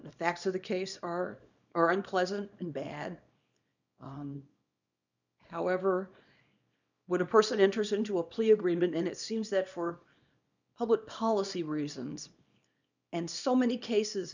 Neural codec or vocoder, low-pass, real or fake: codec, 24 kHz, 0.9 kbps, WavTokenizer, small release; 7.2 kHz; fake